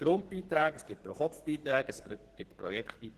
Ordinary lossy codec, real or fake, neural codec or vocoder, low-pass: Opus, 16 kbps; fake; codec, 32 kHz, 1.9 kbps, SNAC; 14.4 kHz